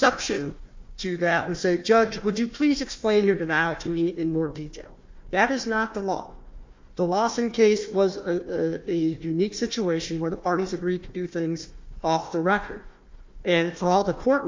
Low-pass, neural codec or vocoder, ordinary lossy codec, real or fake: 7.2 kHz; codec, 16 kHz, 1 kbps, FunCodec, trained on Chinese and English, 50 frames a second; MP3, 48 kbps; fake